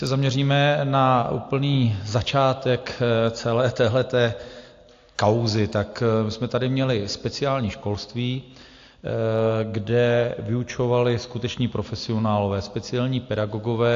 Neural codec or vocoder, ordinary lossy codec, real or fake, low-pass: none; AAC, 48 kbps; real; 7.2 kHz